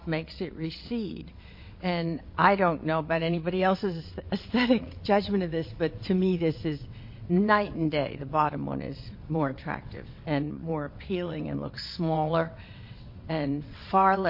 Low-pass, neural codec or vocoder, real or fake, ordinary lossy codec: 5.4 kHz; vocoder, 22.05 kHz, 80 mel bands, WaveNeXt; fake; MP3, 32 kbps